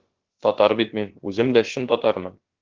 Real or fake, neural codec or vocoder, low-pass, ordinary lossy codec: fake; codec, 16 kHz, about 1 kbps, DyCAST, with the encoder's durations; 7.2 kHz; Opus, 16 kbps